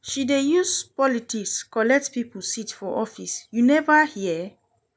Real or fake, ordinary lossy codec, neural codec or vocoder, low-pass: real; none; none; none